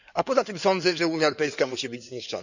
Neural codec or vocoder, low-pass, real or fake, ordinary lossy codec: codec, 16 kHz in and 24 kHz out, 2.2 kbps, FireRedTTS-2 codec; 7.2 kHz; fake; none